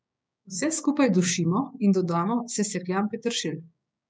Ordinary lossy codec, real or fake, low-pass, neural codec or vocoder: none; fake; none; codec, 16 kHz, 6 kbps, DAC